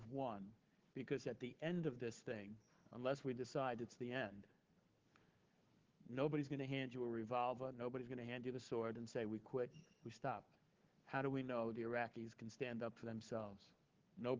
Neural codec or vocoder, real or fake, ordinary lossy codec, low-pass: codec, 16 kHz, 4 kbps, FunCodec, trained on Chinese and English, 50 frames a second; fake; Opus, 16 kbps; 7.2 kHz